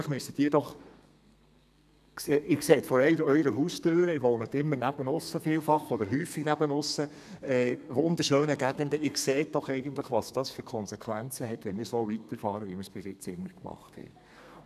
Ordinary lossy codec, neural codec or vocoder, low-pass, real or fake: none; codec, 32 kHz, 1.9 kbps, SNAC; 14.4 kHz; fake